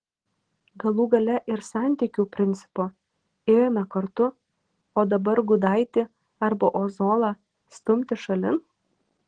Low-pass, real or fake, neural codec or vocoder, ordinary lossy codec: 9.9 kHz; real; none; Opus, 16 kbps